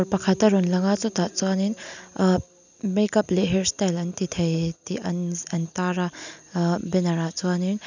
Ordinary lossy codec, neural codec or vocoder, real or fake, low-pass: none; none; real; 7.2 kHz